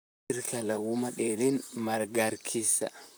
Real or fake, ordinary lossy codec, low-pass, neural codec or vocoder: fake; none; none; vocoder, 44.1 kHz, 128 mel bands, Pupu-Vocoder